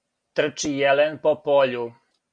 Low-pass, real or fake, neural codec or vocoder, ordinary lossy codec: 9.9 kHz; real; none; MP3, 64 kbps